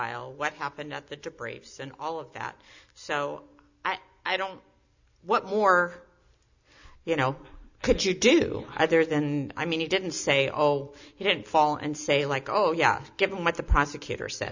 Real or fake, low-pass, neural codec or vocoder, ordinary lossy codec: real; 7.2 kHz; none; Opus, 64 kbps